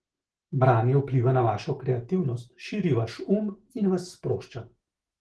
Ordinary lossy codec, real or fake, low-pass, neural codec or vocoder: Opus, 16 kbps; fake; 10.8 kHz; autoencoder, 48 kHz, 128 numbers a frame, DAC-VAE, trained on Japanese speech